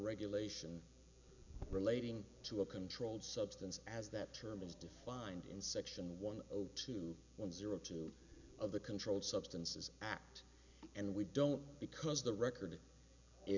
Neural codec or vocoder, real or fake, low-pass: none; real; 7.2 kHz